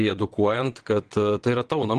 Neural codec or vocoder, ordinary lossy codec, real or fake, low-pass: vocoder, 22.05 kHz, 80 mel bands, WaveNeXt; Opus, 16 kbps; fake; 9.9 kHz